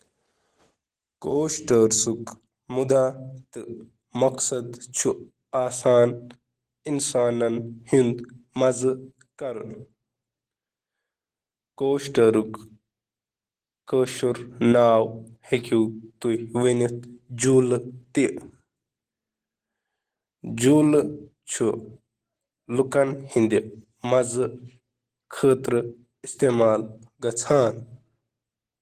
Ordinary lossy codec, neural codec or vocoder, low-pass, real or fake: Opus, 16 kbps; none; 14.4 kHz; real